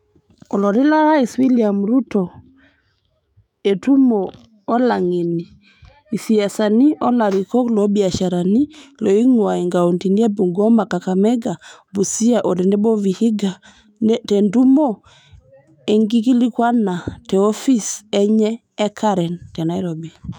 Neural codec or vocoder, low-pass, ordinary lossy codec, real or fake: autoencoder, 48 kHz, 128 numbers a frame, DAC-VAE, trained on Japanese speech; 19.8 kHz; none; fake